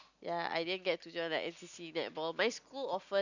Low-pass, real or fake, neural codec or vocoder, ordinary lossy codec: 7.2 kHz; real; none; none